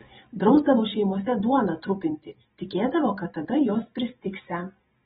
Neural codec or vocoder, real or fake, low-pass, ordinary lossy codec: none; real; 19.8 kHz; AAC, 16 kbps